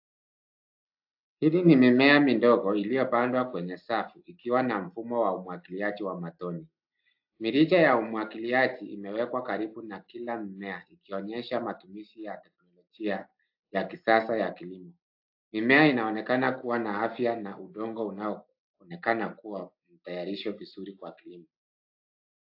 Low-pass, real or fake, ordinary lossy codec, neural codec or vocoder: 5.4 kHz; real; AAC, 48 kbps; none